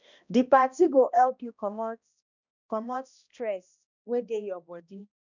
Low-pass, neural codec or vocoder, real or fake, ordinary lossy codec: 7.2 kHz; codec, 16 kHz, 1 kbps, X-Codec, HuBERT features, trained on balanced general audio; fake; none